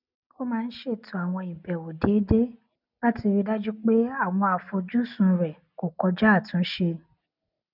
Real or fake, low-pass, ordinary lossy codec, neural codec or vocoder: real; 5.4 kHz; none; none